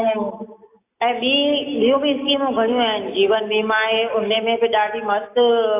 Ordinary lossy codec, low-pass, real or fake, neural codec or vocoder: AAC, 24 kbps; 3.6 kHz; real; none